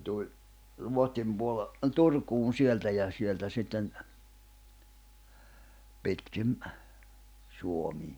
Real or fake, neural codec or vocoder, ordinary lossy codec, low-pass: real; none; none; none